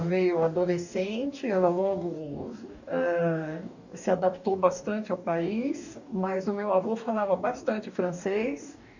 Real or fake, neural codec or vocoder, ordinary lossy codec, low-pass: fake; codec, 44.1 kHz, 2.6 kbps, DAC; none; 7.2 kHz